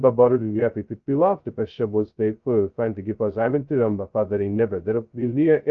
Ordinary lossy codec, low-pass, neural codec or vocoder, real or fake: Opus, 24 kbps; 7.2 kHz; codec, 16 kHz, 0.2 kbps, FocalCodec; fake